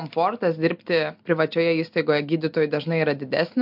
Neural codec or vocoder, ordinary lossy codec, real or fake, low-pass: none; MP3, 48 kbps; real; 5.4 kHz